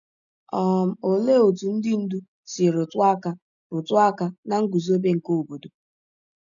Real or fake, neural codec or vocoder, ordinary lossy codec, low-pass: real; none; none; 7.2 kHz